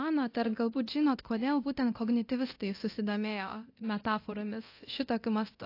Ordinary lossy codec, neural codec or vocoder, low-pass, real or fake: AAC, 32 kbps; codec, 24 kHz, 0.9 kbps, DualCodec; 5.4 kHz; fake